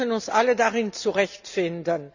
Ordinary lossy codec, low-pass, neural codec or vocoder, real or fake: none; 7.2 kHz; none; real